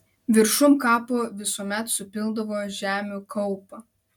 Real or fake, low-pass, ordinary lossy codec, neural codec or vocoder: real; 19.8 kHz; MP3, 96 kbps; none